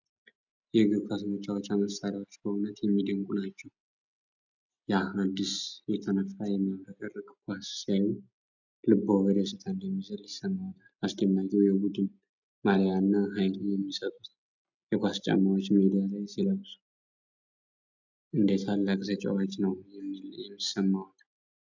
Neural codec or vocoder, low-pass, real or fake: none; 7.2 kHz; real